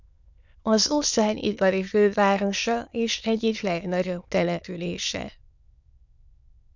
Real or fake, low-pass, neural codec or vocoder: fake; 7.2 kHz; autoencoder, 22.05 kHz, a latent of 192 numbers a frame, VITS, trained on many speakers